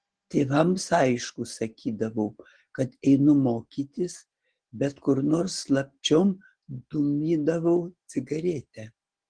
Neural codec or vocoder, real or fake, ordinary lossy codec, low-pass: none; real; Opus, 16 kbps; 9.9 kHz